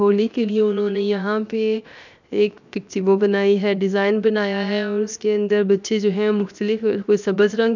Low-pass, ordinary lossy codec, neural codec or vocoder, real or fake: 7.2 kHz; none; codec, 16 kHz, 0.7 kbps, FocalCodec; fake